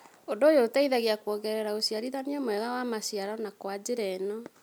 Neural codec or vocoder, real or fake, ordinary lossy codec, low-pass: none; real; none; none